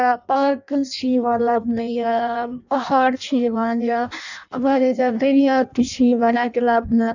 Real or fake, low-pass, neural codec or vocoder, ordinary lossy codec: fake; 7.2 kHz; codec, 16 kHz in and 24 kHz out, 0.6 kbps, FireRedTTS-2 codec; none